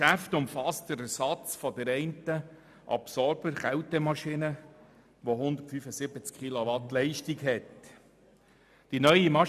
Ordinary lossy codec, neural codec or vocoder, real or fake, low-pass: none; none; real; 14.4 kHz